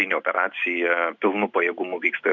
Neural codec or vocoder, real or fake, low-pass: none; real; 7.2 kHz